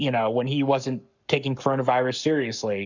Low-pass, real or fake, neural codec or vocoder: 7.2 kHz; fake; codec, 44.1 kHz, 7.8 kbps, Pupu-Codec